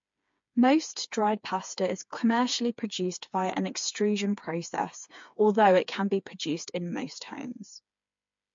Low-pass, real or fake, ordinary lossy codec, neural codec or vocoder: 7.2 kHz; fake; MP3, 48 kbps; codec, 16 kHz, 4 kbps, FreqCodec, smaller model